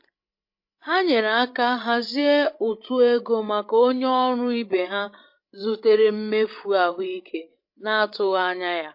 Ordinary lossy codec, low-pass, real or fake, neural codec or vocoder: MP3, 32 kbps; 5.4 kHz; fake; codec, 16 kHz, 16 kbps, FreqCodec, larger model